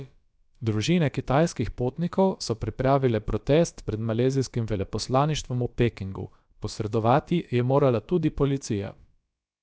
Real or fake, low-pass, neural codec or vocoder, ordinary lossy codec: fake; none; codec, 16 kHz, about 1 kbps, DyCAST, with the encoder's durations; none